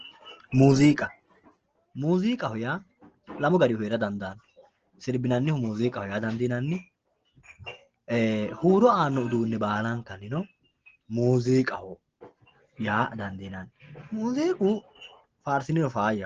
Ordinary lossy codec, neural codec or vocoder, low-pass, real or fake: Opus, 16 kbps; none; 7.2 kHz; real